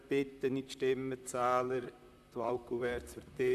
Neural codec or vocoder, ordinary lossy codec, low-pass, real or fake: vocoder, 44.1 kHz, 128 mel bands, Pupu-Vocoder; none; 14.4 kHz; fake